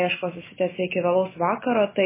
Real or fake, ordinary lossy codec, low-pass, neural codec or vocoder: real; MP3, 16 kbps; 3.6 kHz; none